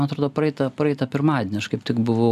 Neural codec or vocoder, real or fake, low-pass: none; real; 14.4 kHz